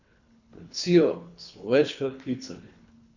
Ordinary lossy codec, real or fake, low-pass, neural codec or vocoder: none; fake; 7.2 kHz; codec, 24 kHz, 3 kbps, HILCodec